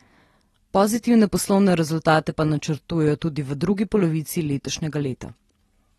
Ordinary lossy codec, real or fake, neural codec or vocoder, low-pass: AAC, 32 kbps; real; none; 19.8 kHz